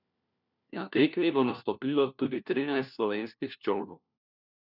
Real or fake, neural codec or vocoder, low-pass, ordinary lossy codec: fake; codec, 16 kHz, 1 kbps, FunCodec, trained on LibriTTS, 50 frames a second; 5.4 kHz; none